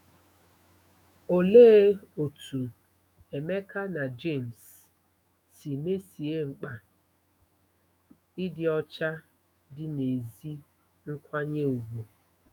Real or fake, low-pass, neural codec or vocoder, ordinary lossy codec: fake; none; autoencoder, 48 kHz, 128 numbers a frame, DAC-VAE, trained on Japanese speech; none